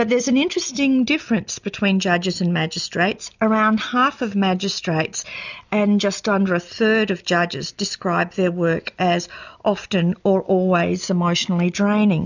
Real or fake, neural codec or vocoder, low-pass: real; none; 7.2 kHz